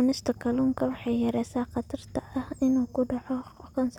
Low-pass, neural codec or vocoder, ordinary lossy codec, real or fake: 19.8 kHz; none; none; real